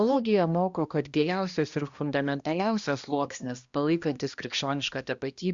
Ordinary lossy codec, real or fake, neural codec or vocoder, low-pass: Opus, 64 kbps; fake; codec, 16 kHz, 1 kbps, X-Codec, HuBERT features, trained on balanced general audio; 7.2 kHz